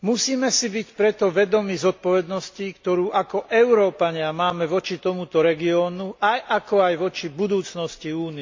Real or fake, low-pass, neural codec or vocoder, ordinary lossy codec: real; 7.2 kHz; none; MP3, 48 kbps